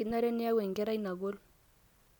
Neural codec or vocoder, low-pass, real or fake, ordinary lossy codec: none; 19.8 kHz; real; none